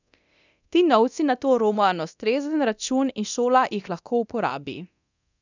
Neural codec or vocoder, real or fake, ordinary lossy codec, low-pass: codec, 24 kHz, 0.9 kbps, DualCodec; fake; none; 7.2 kHz